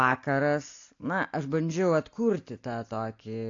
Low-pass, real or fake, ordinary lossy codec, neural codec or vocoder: 7.2 kHz; real; AAC, 48 kbps; none